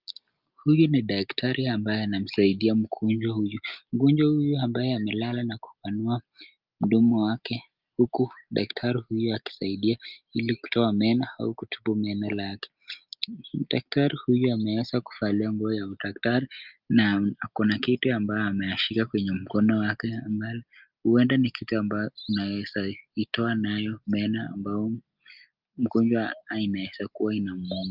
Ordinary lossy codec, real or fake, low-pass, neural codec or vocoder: Opus, 24 kbps; real; 5.4 kHz; none